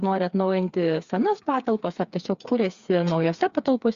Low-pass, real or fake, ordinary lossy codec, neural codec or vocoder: 7.2 kHz; fake; Opus, 64 kbps; codec, 16 kHz, 4 kbps, FreqCodec, smaller model